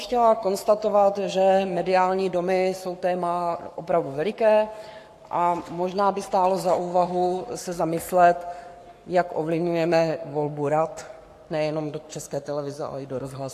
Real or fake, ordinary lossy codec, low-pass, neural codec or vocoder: fake; AAC, 64 kbps; 14.4 kHz; codec, 44.1 kHz, 7.8 kbps, Pupu-Codec